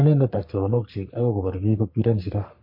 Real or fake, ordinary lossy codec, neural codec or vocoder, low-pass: fake; MP3, 32 kbps; codec, 44.1 kHz, 3.4 kbps, Pupu-Codec; 5.4 kHz